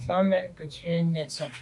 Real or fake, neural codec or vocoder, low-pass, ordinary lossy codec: fake; autoencoder, 48 kHz, 32 numbers a frame, DAC-VAE, trained on Japanese speech; 10.8 kHz; MP3, 64 kbps